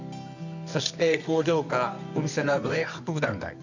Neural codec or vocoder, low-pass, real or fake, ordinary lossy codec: codec, 24 kHz, 0.9 kbps, WavTokenizer, medium music audio release; 7.2 kHz; fake; none